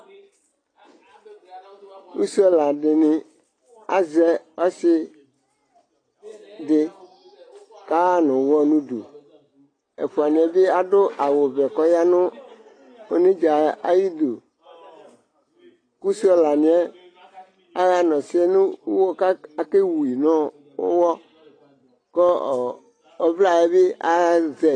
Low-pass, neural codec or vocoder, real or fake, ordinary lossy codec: 9.9 kHz; none; real; AAC, 32 kbps